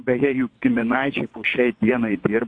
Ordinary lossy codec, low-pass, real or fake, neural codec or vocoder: AAC, 48 kbps; 9.9 kHz; fake; vocoder, 22.05 kHz, 80 mel bands, WaveNeXt